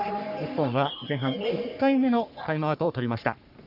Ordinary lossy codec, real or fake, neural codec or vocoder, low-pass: none; fake; codec, 44.1 kHz, 3.4 kbps, Pupu-Codec; 5.4 kHz